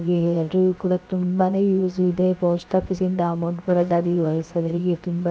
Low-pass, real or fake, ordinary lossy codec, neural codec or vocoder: none; fake; none; codec, 16 kHz, 0.7 kbps, FocalCodec